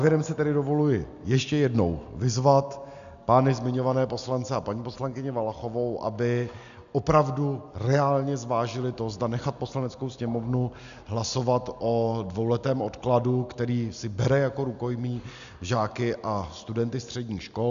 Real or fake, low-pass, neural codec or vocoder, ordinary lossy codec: real; 7.2 kHz; none; MP3, 96 kbps